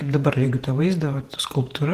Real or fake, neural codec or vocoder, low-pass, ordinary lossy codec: fake; vocoder, 48 kHz, 128 mel bands, Vocos; 14.4 kHz; Opus, 16 kbps